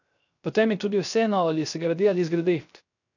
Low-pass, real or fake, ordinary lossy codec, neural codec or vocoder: 7.2 kHz; fake; none; codec, 16 kHz, 0.3 kbps, FocalCodec